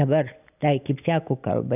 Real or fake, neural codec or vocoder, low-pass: real; none; 3.6 kHz